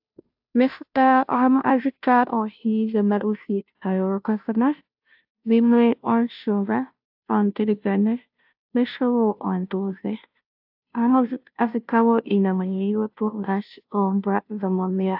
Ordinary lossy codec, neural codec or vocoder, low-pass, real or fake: AAC, 48 kbps; codec, 16 kHz, 0.5 kbps, FunCodec, trained on Chinese and English, 25 frames a second; 5.4 kHz; fake